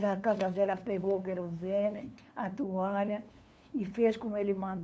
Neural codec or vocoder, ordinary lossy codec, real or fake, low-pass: codec, 16 kHz, 2 kbps, FunCodec, trained on LibriTTS, 25 frames a second; none; fake; none